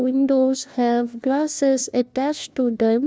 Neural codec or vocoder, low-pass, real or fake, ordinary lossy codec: codec, 16 kHz, 1 kbps, FunCodec, trained on LibriTTS, 50 frames a second; none; fake; none